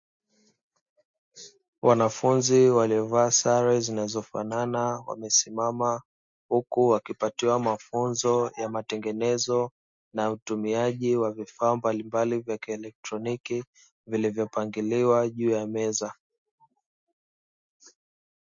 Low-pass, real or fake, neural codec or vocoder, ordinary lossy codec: 7.2 kHz; real; none; MP3, 48 kbps